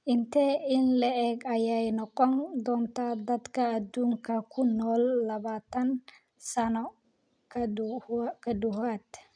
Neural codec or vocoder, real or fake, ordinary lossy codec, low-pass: none; real; none; 9.9 kHz